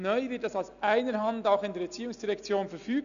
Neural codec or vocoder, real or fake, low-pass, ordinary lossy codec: none; real; 7.2 kHz; none